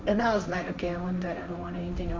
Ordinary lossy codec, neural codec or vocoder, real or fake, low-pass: none; codec, 16 kHz, 1.1 kbps, Voila-Tokenizer; fake; none